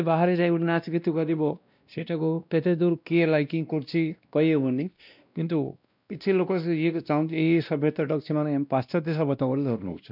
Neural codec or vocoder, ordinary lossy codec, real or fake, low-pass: codec, 16 kHz, 1 kbps, X-Codec, WavLM features, trained on Multilingual LibriSpeech; none; fake; 5.4 kHz